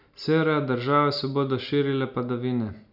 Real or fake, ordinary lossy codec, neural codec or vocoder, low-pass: real; none; none; 5.4 kHz